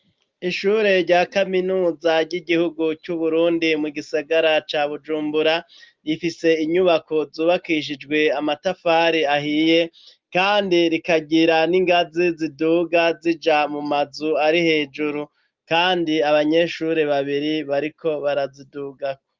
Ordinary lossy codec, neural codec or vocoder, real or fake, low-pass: Opus, 32 kbps; none; real; 7.2 kHz